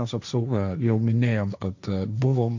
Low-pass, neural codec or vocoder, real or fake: 7.2 kHz; codec, 16 kHz, 1.1 kbps, Voila-Tokenizer; fake